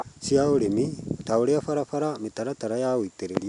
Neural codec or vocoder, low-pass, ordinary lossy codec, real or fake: none; 10.8 kHz; none; real